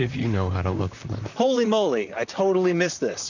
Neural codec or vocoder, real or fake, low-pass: vocoder, 44.1 kHz, 128 mel bands, Pupu-Vocoder; fake; 7.2 kHz